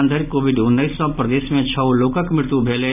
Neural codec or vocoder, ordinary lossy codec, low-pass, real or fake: none; none; 3.6 kHz; real